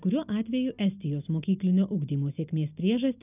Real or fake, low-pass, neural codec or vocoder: real; 3.6 kHz; none